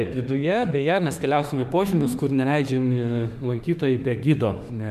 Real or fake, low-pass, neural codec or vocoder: fake; 14.4 kHz; autoencoder, 48 kHz, 32 numbers a frame, DAC-VAE, trained on Japanese speech